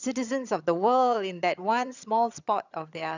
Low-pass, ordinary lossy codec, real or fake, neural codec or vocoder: 7.2 kHz; none; fake; vocoder, 22.05 kHz, 80 mel bands, HiFi-GAN